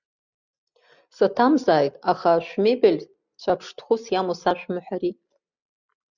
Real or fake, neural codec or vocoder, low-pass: real; none; 7.2 kHz